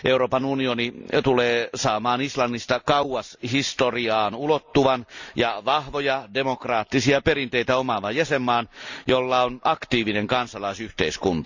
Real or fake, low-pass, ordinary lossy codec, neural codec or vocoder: real; 7.2 kHz; Opus, 64 kbps; none